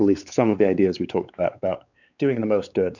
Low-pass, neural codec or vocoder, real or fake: 7.2 kHz; codec, 16 kHz, 4 kbps, X-Codec, WavLM features, trained on Multilingual LibriSpeech; fake